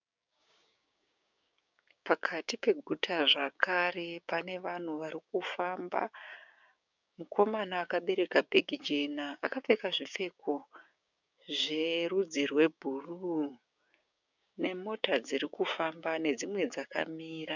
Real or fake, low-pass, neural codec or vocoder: fake; 7.2 kHz; codec, 16 kHz, 6 kbps, DAC